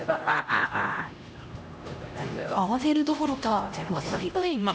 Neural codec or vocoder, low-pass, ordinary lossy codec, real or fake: codec, 16 kHz, 1 kbps, X-Codec, HuBERT features, trained on LibriSpeech; none; none; fake